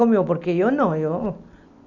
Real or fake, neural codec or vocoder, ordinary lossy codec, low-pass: real; none; none; 7.2 kHz